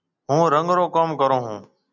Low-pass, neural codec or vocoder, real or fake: 7.2 kHz; none; real